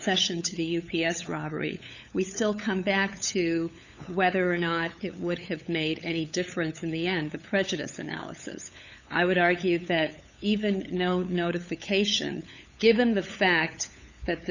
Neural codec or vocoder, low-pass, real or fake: codec, 16 kHz, 8 kbps, FunCodec, trained on LibriTTS, 25 frames a second; 7.2 kHz; fake